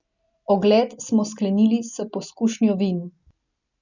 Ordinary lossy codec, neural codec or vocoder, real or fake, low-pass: none; none; real; 7.2 kHz